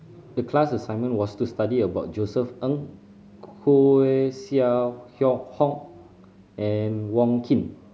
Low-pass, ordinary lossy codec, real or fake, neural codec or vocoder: none; none; real; none